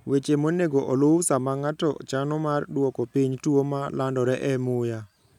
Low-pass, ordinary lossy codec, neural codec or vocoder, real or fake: 19.8 kHz; none; none; real